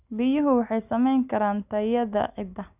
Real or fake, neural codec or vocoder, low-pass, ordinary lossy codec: real; none; 3.6 kHz; none